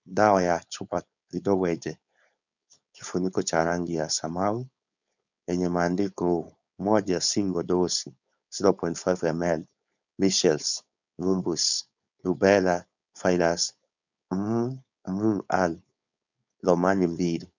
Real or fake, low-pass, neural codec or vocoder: fake; 7.2 kHz; codec, 16 kHz, 4.8 kbps, FACodec